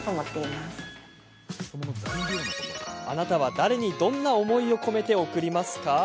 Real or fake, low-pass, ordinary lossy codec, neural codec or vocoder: real; none; none; none